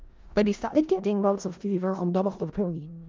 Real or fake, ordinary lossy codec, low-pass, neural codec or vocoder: fake; Opus, 32 kbps; 7.2 kHz; codec, 16 kHz in and 24 kHz out, 0.4 kbps, LongCat-Audio-Codec, four codebook decoder